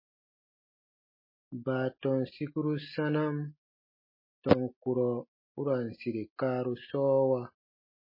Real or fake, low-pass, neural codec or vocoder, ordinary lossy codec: real; 5.4 kHz; none; MP3, 24 kbps